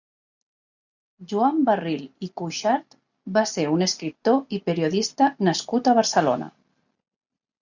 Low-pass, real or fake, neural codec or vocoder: 7.2 kHz; real; none